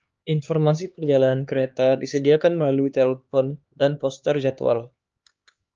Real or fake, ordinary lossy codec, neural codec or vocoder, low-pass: fake; Opus, 24 kbps; codec, 16 kHz, 2 kbps, X-Codec, HuBERT features, trained on LibriSpeech; 7.2 kHz